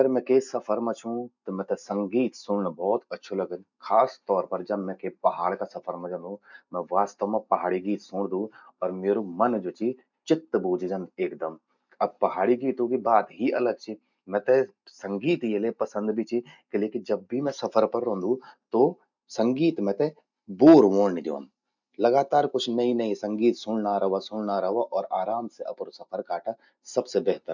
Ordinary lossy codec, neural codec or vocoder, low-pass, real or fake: none; none; 7.2 kHz; real